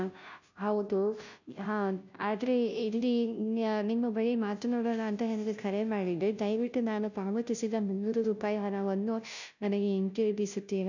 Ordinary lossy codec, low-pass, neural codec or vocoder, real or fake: none; 7.2 kHz; codec, 16 kHz, 0.5 kbps, FunCodec, trained on Chinese and English, 25 frames a second; fake